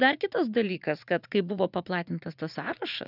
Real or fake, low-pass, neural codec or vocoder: fake; 5.4 kHz; vocoder, 44.1 kHz, 80 mel bands, Vocos